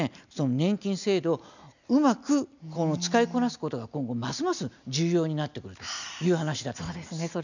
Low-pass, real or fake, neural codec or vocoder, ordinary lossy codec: 7.2 kHz; real; none; none